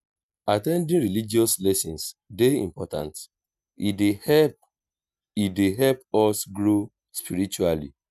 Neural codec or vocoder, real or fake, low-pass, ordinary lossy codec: none; real; 14.4 kHz; none